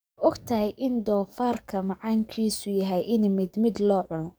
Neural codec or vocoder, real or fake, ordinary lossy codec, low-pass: codec, 44.1 kHz, 7.8 kbps, DAC; fake; none; none